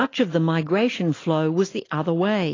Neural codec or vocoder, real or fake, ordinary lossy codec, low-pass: none; real; AAC, 32 kbps; 7.2 kHz